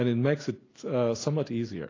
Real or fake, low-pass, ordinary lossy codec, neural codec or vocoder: real; 7.2 kHz; AAC, 32 kbps; none